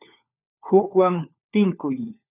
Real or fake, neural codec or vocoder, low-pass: fake; codec, 16 kHz, 4 kbps, FunCodec, trained on LibriTTS, 50 frames a second; 3.6 kHz